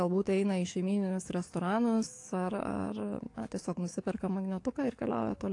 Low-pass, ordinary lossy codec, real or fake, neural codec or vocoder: 10.8 kHz; AAC, 48 kbps; fake; codec, 44.1 kHz, 7.8 kbps, DAC